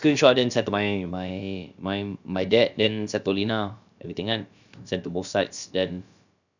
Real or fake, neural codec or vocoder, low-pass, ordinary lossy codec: fake; codec, 16 kHz, about 1 kbps, DyCAST, with the encoder's durations; 7.2 kHz; none